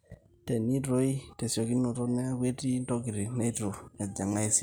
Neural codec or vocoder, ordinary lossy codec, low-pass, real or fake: none; none; none; real